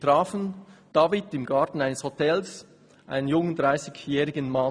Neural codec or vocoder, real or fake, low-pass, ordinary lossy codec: none; real; 9.9 kHz; none